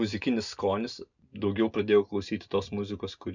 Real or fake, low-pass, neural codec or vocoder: real; 7.2 kHz; none